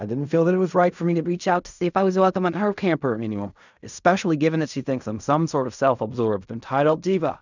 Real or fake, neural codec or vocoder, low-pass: fake; codec, 16 kHz in and 24 kHz out, 0.4 kbps, LongCat-Audio-Codec, fine tuned four codebook decoder; 7.2 kHz